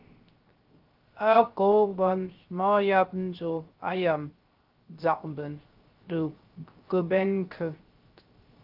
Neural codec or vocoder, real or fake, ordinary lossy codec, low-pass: codec, 16 kHz, 0.3 kbps, FocalCodec; fake; Opus, 32 kbps; 5.4 kHz